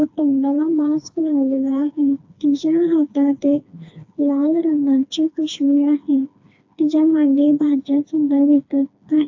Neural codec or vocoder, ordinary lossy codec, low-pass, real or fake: codec, 16 kHz, 2 kbps, FreqCodec, smaller model; AAC, 48 kbps; 7.2 kHz; fake